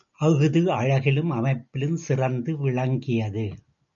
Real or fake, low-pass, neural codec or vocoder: real; 7.2 kHz; none